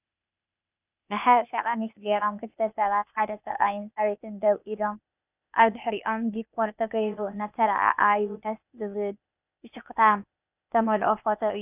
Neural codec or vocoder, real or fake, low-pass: codec, 16 kHz, 0.8 kbps, ZipCodec; fake; 3.6 kHz